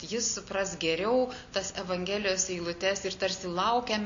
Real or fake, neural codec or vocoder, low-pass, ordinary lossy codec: real; none; 7.2 kHz; MP3, 64 kbps